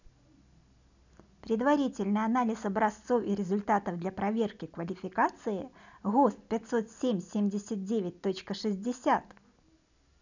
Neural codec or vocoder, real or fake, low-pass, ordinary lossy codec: none; real; 7.2 kHz; none